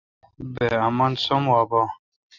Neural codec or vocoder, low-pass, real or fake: none; 7.2 kHz; real